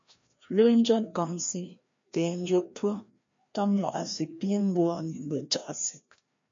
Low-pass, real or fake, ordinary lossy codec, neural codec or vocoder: 7.2 kHz; fake; MP3, 48 kbps; codec, 16 kHz, 1 kbps, FreqCodec, larger model